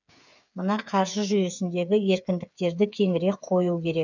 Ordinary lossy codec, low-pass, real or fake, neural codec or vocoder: none; 7.2 kHz; fake; codec, 16 kHz, 8 kbps, FreqCodec, smaller model